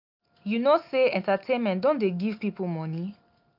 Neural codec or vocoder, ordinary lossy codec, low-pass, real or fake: none; none; 5.4 kHz; real